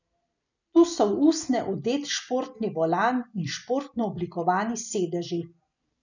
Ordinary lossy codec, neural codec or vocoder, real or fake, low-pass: none; none; real; 7.2 kHz